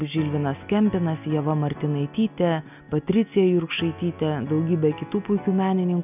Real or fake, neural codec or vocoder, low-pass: real; none; 3.6 kHz